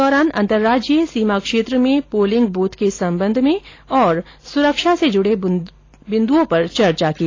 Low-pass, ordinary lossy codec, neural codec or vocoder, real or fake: 7.2 kHz; AAC, 32 kbps; none; real